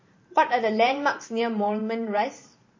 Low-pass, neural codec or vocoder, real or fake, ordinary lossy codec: 7.2 kHz; vocoder, 44.1 kHz, 128 mel bands every 512 samples, BigVGAN v2; fake; MP3, 32 kbps